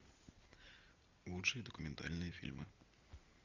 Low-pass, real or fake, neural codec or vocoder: 7.2 kHz; real; none